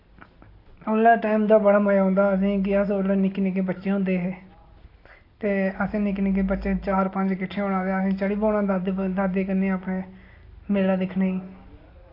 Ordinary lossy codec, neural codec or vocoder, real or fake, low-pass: AAC, 32 kbps; none; real; 5.4 kHz